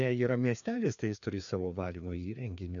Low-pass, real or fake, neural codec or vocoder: 7.2 kHz; fake; codec, 16 kHz, 2 kbps, FreqCodec, larger model